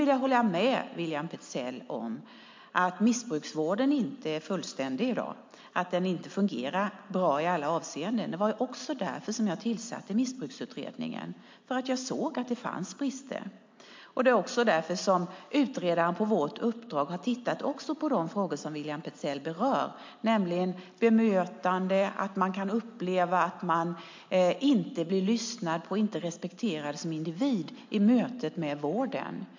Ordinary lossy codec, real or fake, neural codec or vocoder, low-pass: MP3, 48 kbps; real; none; 7.2 kHz